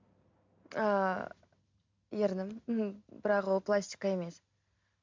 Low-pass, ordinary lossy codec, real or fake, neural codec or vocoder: 7.2 kHz; MP3, 64 kbps; real; none